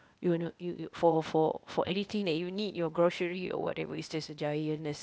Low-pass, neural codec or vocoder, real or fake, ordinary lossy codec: none; codec, 16 kHz, 0.8 kbps, ZipCodec; fake; none